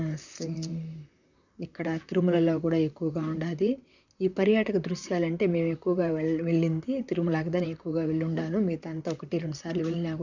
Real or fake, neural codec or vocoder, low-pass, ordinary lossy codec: fake; vocoder, 44.1 kHz, 128 mel bands, Pupu-Vocoder; 7.2 kHz; none